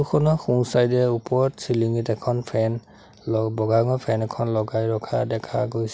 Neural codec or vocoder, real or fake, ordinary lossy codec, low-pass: none; real; none; none